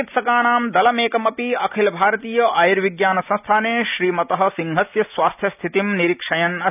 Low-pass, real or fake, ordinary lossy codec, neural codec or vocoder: 3.6 kHz; real; none; none